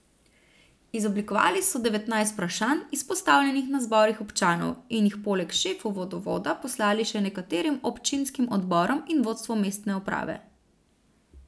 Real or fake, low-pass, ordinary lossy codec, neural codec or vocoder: real; none; none; none